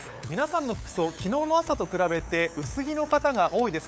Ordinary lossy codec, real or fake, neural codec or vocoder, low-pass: none; fake; codec, 16 kHz, 8 kbps, FunCodec, trained on LibriTTS, 25 frames a second; none